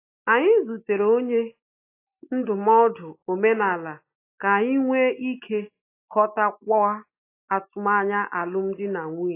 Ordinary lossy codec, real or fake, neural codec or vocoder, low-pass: AAC, 24 kbps; real; none; 3.6 kHz